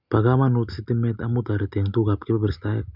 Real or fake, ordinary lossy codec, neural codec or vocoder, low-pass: real; none; none; 5.4 kHz